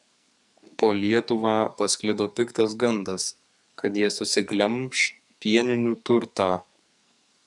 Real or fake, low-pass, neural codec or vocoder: fake; 10.8 kHz; codec, 44.1 kHz, 2.6 kbps, SNAC